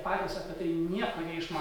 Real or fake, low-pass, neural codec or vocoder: fake; 19.8 kHz; vocoder, 48 kHz, 128 mel bands, Vocos